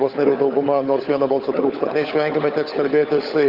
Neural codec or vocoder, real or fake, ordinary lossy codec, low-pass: codec, 16 kHz, 16 kbps, FunCodec, trained on LibriTTS, 50 frames a second; fake; Opus, 32 kbps; 5.4 kHz